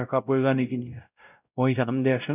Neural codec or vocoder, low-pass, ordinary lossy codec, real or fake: codec, 16 kHz, 0.5 kbps, X-Codec, WavLM features, trained on Multilingual LibriSpeech; 3.6 kHz; none; fake